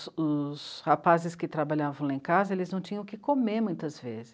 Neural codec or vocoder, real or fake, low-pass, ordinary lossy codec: none; real; none; none